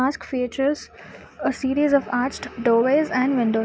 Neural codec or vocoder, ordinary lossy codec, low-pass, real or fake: none; none; none; real